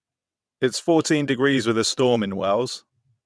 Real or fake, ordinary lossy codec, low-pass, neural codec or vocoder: fake; none; none; vocoder, 22.05 kHz, 80 mel bands, WaveNeXt